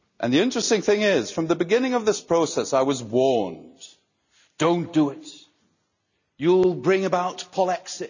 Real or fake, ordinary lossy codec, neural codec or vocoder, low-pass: real; none; none; 7.2 kHz